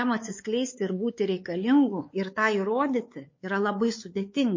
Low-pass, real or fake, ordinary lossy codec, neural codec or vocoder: 7.2 kHz; fake; MP3, 32 kbps; codec, 16 kHz, 4 kbps, X-Codec, WavLM features, trained on Multilingual LibriSpeech